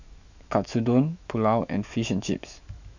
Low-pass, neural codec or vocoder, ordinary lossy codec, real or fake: 7.2 kHz; autoencoder, 48 kHz, 128 numbers a frame, DAC-VAE, trained on Japanese speech; none; fake